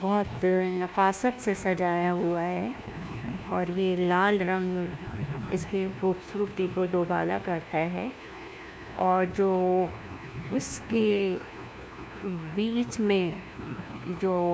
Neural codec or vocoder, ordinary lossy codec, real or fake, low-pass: codec, 16 kHz, 1 kbps, FunCodec, trained on LibriTTS, 50 frames a second; none; fake; none